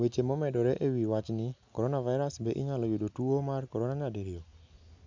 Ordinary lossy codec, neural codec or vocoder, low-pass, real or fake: MP3, 64 kbps; none; 7.2 kHz; real